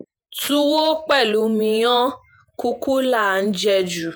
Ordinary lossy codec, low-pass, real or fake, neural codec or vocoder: none; none; fake; vocoder, 48 kHz, 128 mel bands, Vocos